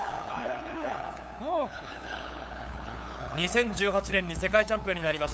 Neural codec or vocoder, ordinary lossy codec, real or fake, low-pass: codec, 16 kHz, 8 kbps, FunCodec, trained on LibriTTS, 25 frames a second; none; fake; none